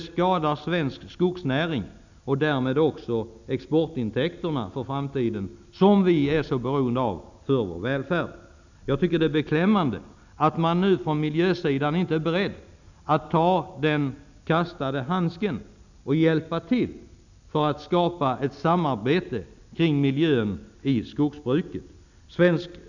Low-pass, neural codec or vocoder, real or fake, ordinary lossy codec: 7.2 kHz; none; real; none